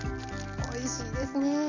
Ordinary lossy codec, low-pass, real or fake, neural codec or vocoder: none; 7.2 kHz; real; none